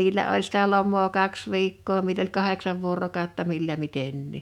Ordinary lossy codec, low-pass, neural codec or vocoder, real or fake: none; 19.8 kHz; autoencoder, 48 kHz, 128 numbers a frame, DAC-VAE, trained on Japanese speech; fake